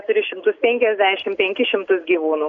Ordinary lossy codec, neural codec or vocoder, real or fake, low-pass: AAC, 64 kbps; none; real; 7.2 kHz